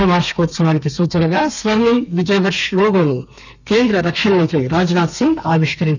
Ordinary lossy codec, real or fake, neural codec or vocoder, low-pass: none; fake; codec, 32 kHz, 1.9 kbps, SNAC; 7.2 kHz